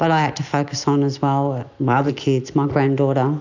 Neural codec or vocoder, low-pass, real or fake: none; 7.2 kHz; real